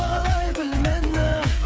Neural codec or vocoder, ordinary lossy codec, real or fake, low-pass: codec, 16 kHz, 8 kbps, FreqCodec, smaller model; none; fake; none